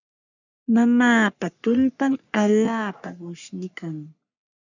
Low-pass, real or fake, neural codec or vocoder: 7.2 kHz; fake; codec, 44.1 kHz, 3.4 kbps, Pupu-Codec